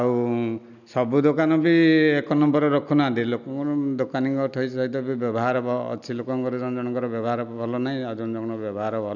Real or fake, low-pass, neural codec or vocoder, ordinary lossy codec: real; 7.2 kHz; none; none